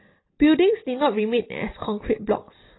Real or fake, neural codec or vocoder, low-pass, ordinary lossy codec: real; none; 7.2 kHz; AAC, 16 kbps